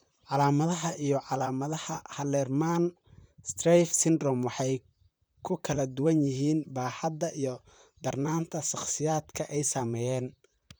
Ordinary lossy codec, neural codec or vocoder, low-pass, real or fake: none; vocoder, 44.1 kHz, 128 mel bands, Pupu-Vocoder; none; fake